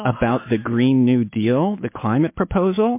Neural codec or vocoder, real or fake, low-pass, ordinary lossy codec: autoencoder, 48 kHz, 128 numbers a frame, DAC-VAE, trained on Japanese speech; fake; 3.6 kHz; MP3, 24 kbps